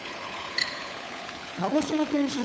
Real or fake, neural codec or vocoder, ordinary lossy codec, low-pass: fake; codec, 16 kHz, 16 kbps, FunCodec, trained on LibriTTS, 50 frames a second; none; none